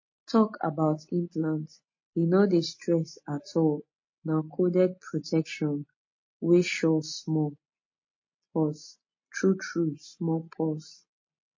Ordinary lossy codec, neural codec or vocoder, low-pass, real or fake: MP3, 32 kbps; none; 7.2 kHz; real